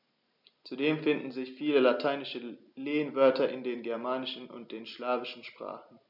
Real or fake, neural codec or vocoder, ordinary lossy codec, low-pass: real; none; none; 5.4 kHz